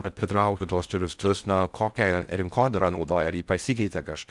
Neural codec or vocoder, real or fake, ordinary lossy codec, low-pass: codec, 16 kHz in and 24 kHz out, 0.6 kbps, FocalCodec, streaming, 2048 codes; fake; Opus, 64 kbps; 10.8 kHz